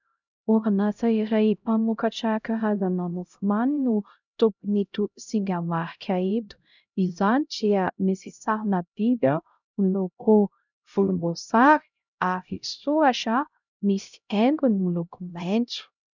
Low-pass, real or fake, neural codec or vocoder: 7.2 kHz; fake; codec, 16 kHz, 0.5 kbps, X-Codec, HuBERT features, trained on LibriSpeech